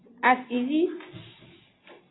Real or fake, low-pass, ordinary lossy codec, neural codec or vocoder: real; 7.2 kHz; AAC, 16 kbps; none